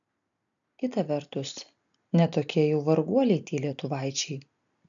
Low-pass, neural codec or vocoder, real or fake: 7.2 kHz; none; real